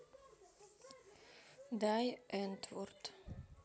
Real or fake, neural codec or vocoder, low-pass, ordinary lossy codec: real; none; none; none